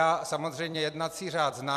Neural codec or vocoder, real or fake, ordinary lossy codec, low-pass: vocoder, 44.1 kHz, 128 mel bands every 256 samples, BigVGAN v2; fake; MP3, 96 kbps; 14.4 kHz